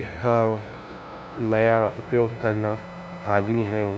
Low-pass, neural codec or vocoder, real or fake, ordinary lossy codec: none; codec, 16 kHz, 0.5 kbps, FunCodec, trained on LibriTTS, 25 frames a second; fake; none